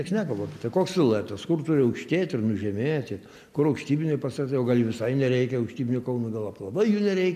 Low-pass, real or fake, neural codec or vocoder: 14.4 kHz; real; none